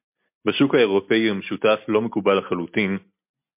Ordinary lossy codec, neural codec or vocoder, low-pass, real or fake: MP3, 32 kbps; none; 3.6 kHz; real